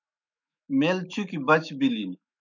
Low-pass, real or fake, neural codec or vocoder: 7.2 kHz; fake; autoencoder, 48 kHz, 128 numbers a frame, DAC-VAE, trained on Japanese speech